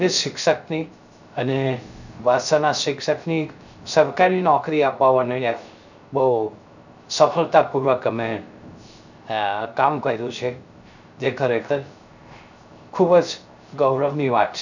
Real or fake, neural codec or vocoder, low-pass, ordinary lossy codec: fake; codec, 16 kHz, 0.3 kbps, FocalCodec; 7.2 kHz; none